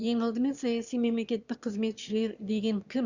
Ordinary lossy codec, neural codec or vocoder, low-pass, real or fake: Opus, 64 kbps; autoencoder, 22.05 kHz, a latent of 192 numbers a frame, VITS, trained on one speaker; 7.2 kHz; fake